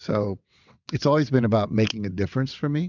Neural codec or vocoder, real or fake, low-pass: vocoder, 22.05 kHz, 80 mel bands, WaveNeXt; fake; 7.2 kHz